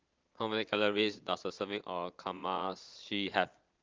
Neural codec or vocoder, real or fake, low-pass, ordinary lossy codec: vocoder, 22.05 kHz, 80 mel bands, Vocos; fake; 7.2 kHz; Opus, 24 kbps